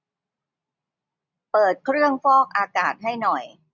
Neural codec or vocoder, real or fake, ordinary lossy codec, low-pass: none; real; none; 7.2 kHz